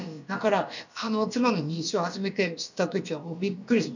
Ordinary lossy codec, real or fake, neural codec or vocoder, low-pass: none; fake; codec, 16 kHz, about 1 kbps, DyCAST, with the encoder's durations; 7.2 kHz